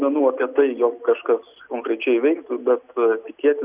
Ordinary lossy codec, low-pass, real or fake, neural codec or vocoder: Opus, 64 kbps; 3.6 kHz; real; none